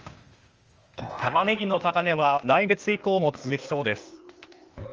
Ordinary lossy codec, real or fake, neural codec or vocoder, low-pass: Opus, 24 kbps; fake; codec, 16 kHz, 0.8 kbps, ZipCodec; 7.2 kHz